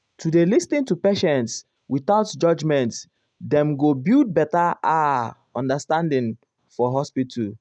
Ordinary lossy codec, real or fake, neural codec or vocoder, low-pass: none; real; none; 9.9 kHz